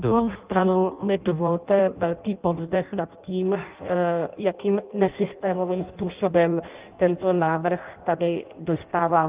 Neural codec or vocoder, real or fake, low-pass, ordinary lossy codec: codec, 16 kHz in and 24 kHz out, 0.6 kbps, FireRedTTS-2 codec; fake; 3.6 kHz; Opus, 32 kbps